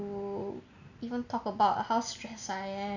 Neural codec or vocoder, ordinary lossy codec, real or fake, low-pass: none; none; real; 7.2 kHz